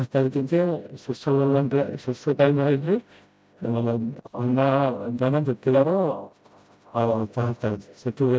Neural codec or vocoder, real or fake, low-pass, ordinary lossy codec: codec, 16 kHz, 0.5 kbps, FreqCodec, smaller model; fake; none; none